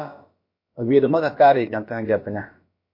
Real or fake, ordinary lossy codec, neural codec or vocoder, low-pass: fake; MP3, 32 kbps; codec, 16 kHz, about 1 kbps, DyCAST, with the encoder's durations; 5.4 kHz